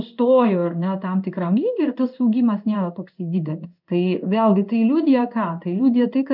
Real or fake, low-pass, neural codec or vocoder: fake; 5.4 kHz; codec, 16 kHz in and 24 kHz out, 1 kbps, XY-Tokenizer